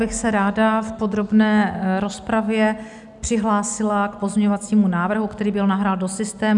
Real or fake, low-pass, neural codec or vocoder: real; 10.8 kHz; none